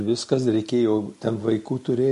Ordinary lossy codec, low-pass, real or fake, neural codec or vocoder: MP3, 48 kbps; 14.4 kHz; real; none